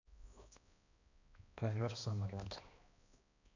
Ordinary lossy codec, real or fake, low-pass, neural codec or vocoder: none; fake; 7.2 kHz; codec, 16 kHz, 1 kbps, X-Codec, HuBERT features, trained on balanced general audio